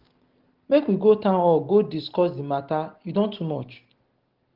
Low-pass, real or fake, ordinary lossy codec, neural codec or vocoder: 5.4 kHz; real; Opus, 16 kbps; none